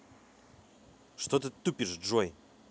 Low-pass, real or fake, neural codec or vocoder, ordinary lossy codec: none; real; none; none